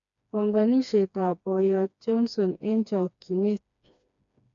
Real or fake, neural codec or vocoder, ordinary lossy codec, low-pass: fake; codec, 16 kHz, 2 kbps, FreqCodec, smaller model; none; 7.2 kHz